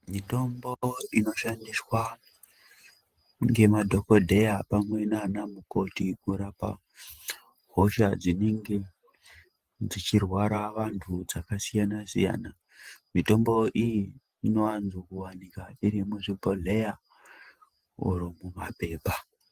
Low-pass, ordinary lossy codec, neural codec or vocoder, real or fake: 14.4 kHz; Opus, 24 kbps; vocoder, 48 kHz, 128 mel bands, Vocos; fake